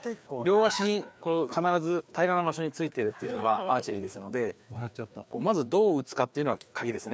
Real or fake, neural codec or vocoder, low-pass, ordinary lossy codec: fake; codec, 16 kHz, 2 kbps, FreqCodec, larger model; none; none